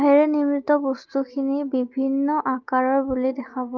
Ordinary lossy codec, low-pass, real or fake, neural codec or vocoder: Opus, 32 kbps; 7.2 kHz; real; none